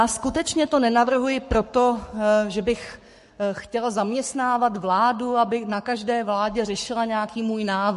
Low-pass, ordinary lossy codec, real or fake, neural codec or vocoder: 14.4 kHz; MP3, 48 kbps; fake; codec, 44.1 kHz, 7.8 kbps, Pupu-Codec